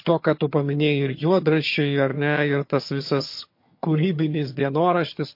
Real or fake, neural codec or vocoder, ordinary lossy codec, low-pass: fake; vocoder, 22.05 kHz, 80 mel bands, HiFi-GAN; MP3, 32 kbps; 5.4 kHz